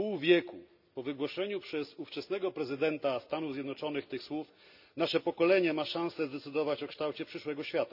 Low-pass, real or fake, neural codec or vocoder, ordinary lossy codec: 5.4 kHz; real; none; none